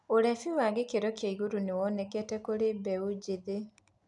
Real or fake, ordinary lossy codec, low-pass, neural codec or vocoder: real; none; 10.8 kHz; none